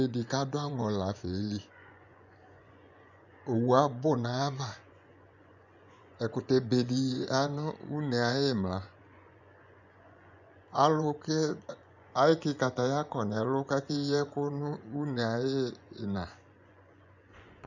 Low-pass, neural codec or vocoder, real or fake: 7.2 kHz; vocoder, 24 kHz, 100 mel bands, Vocos; fake